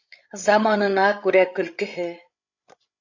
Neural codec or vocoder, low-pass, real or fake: codec, 16 kHz, 8 kbps, FreqCodec, larger model; 7.2 kHz; fake